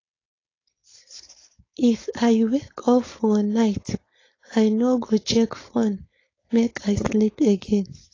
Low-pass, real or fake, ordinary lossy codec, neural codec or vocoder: 7.2 kHz; fake; AAC, 32 kbps; codec, 16 kHz, 4.8 kbps, FACodec